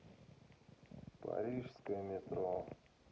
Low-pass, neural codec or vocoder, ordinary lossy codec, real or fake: none; none; none; real